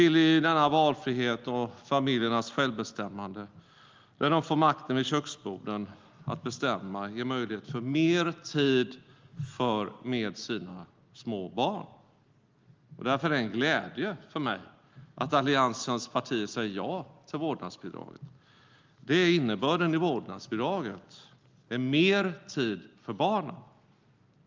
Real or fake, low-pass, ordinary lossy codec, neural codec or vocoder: real; 7.2 kHz; Opus, 32 kbps; none